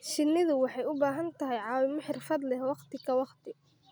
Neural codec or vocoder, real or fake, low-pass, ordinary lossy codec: none; real; none; none